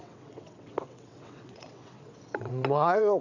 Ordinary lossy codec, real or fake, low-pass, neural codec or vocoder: none; fake; 7.2 kHz; vocoder, 22.05 kHz, 80 mel bands, WaveNeXt